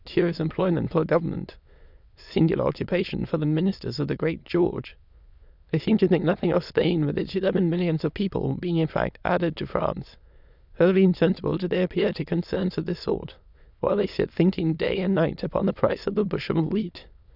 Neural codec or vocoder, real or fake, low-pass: autoencoder, 22.05 kHz, a latent of 192 numbers a frame, VITS, trained on many speakers; fake; 5.4 kHz